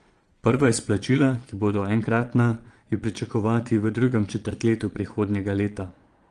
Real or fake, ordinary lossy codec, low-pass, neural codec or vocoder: fake; Opus, 24 kbps; 9.9 kHz; vocoder, 22.05 kHz, 80 mel bands, Vocos